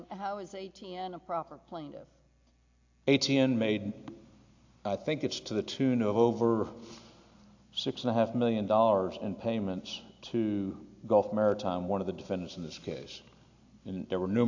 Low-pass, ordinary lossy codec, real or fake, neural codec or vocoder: 7.2 kHz; AAC, 48 kbps; real; none